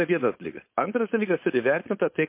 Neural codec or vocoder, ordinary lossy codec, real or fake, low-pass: codec, 16 kHz, 4.8 kbps, FACodec; MP3, 24 kbps; fake; 3.6 kHz